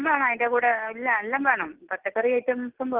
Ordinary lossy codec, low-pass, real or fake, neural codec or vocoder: Opus, 16 kbps; 3.6 kHz; real; none